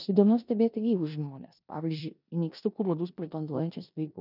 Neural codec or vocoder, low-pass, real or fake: codec, 16 kHz in and 24 kHz out, 0.9 kbps, LongCat-Audio-Codec, four codebook decoder; 5.4 kHz; fake